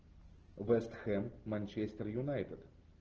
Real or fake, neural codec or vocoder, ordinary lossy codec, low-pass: real; none; Opus, 16 kbps; 7.2 kHz